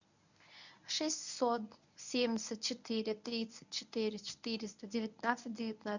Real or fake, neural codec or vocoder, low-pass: fake; codec, 24 kHz, 0.9 kbps, WavTokenizer, medium speech release version 1; 7.2 kHz